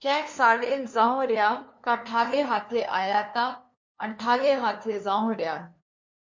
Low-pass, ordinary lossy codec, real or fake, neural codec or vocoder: 7.2 kHz; MP3, 48 kbps; fake; codec, 16 kHz, 2 kbps, FunCodec, trained on LibriTTS, 25 frames a second